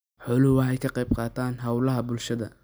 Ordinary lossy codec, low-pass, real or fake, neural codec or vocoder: none; none; real; none